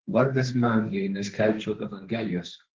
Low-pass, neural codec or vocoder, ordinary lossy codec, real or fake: 7.2 kHz; codec, 16 kHz, 1.1 kbps, Voila-Tokenizer; Opus, 16 kbps; fake